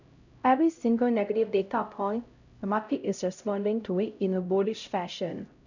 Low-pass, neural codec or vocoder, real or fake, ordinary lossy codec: 7.2 kHz; codec, 16 kHz, 0.5 kbps, X-Codec, HuBERT features, trained on LibriSpeech; fake; none